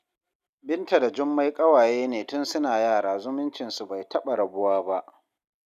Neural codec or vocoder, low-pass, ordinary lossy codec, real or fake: none; 14.4 kHz; none; real